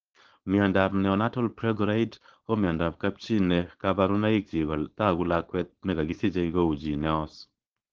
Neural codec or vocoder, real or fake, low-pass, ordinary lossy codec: codec, 16 kHz, 4.8 kbps, FACodec; fake; 7.2 kHz; Opus, 16 kbps